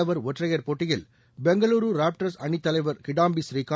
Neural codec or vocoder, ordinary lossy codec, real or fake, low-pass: none; none; real; none